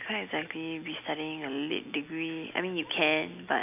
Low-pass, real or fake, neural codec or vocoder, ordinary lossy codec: 3.6 kHz; real; none; AAC, 32 kbps